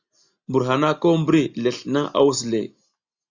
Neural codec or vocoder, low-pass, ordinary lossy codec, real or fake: none; 7.2 kHz; Opus, 64 kbps; real